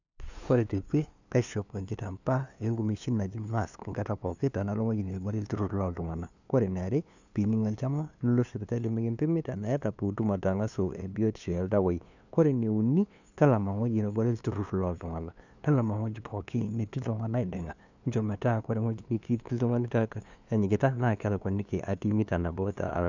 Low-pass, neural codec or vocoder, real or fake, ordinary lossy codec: 7.2 kHz; codec, 16 kHz, 2 kbps, FunCodec, trained on LibriTTS, 25 frames a second; fake; none